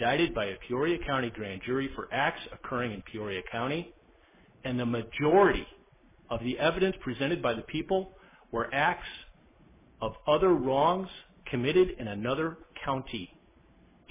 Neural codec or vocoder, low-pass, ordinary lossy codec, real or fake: none; 3.6 kHz; MP3, 16 kbps; real